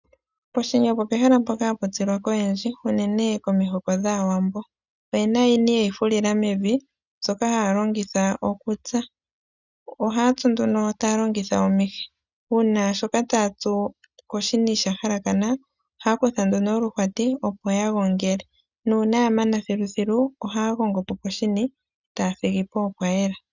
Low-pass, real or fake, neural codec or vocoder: 7.2 kHz; real; none